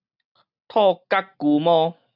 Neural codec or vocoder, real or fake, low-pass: none; real; 5.4 kHz